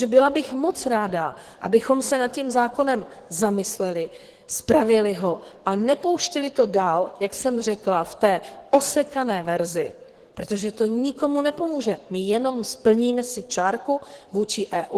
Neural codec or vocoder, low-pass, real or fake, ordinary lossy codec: codec, 44.1 kHz, 2.6 kbps, SNAC; 14.4 kHz; fake; Opus, 16 kbps